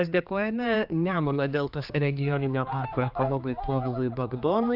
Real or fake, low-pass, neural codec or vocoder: fake; 5.4 kHz; codec, 16 kHz, 2 kbps, X-Codec, HuBERT features, trained on general audio